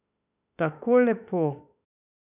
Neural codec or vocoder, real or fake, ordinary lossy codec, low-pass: autoencoder, 48 kHz, 32 numbers a frame, DAC-VAE, trained on Japanese speech; fake; none; 3.6 kHz